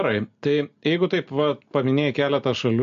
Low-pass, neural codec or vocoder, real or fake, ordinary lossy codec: 7.2 kHz; none; real; MP3, 48 kbps